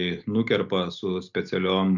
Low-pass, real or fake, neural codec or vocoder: 7.2 kHz; real; none